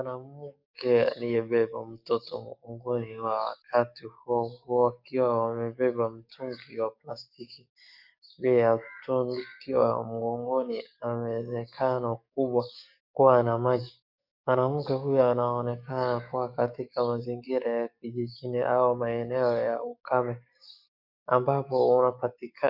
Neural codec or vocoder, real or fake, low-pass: codec, 44.1 kHz, 7.8 kbps, DAC; fake; 5.4 kHz